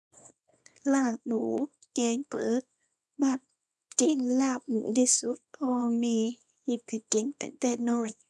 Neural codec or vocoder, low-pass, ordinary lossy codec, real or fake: codec, 24 kHz, 0.9 kbps, WavTokenizer, small release; none; none; fake